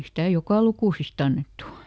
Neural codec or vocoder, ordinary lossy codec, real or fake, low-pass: none; none; real; none